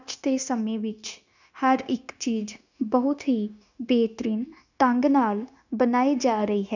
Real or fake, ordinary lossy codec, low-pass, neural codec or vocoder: fake; none; 7.2 kHz; codec, 16 kHz, 0.9 kbps, LongCat-Audio-Codec